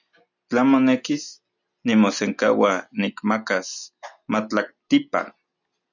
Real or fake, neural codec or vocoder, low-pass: real; none; 7.2 kHz